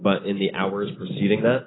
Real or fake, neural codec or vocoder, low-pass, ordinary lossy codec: real; none; 7.2 kHz; AAC, 16 kbps